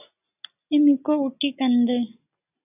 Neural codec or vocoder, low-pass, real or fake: none; 3.6 kHz; real